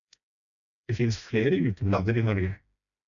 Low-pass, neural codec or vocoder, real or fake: 7.2 kHz; codec, 16 kHz, 1 kbps, FreqCodec, smaller model; fake